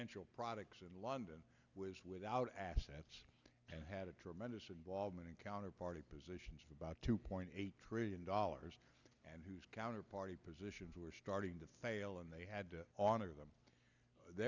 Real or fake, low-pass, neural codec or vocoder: real; 7.2 kHz; none